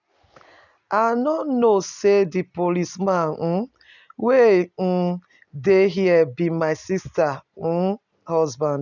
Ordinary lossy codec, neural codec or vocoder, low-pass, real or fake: none; none; 7.2 kHz; real